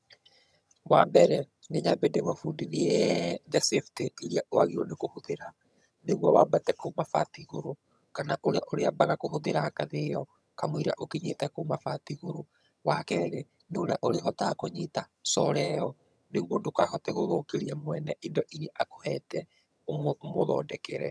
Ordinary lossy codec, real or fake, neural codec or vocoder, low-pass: none; fake; vocoder, 22.05 kHz, 80 mel bands, HiFi-GAN; none